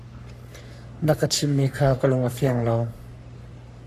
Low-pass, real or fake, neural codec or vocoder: 14.4 kHz; fake; codec, 44.1 kHz, 3.4 kbps, Pupu-Codec